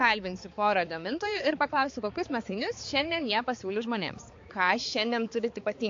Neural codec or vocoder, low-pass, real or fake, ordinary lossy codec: codec, 16 kHz, 4 kbps, X-Codec, HuBERT features, trained on balanced general audio; 7.2 kHz; fake; AAC, 48 kbps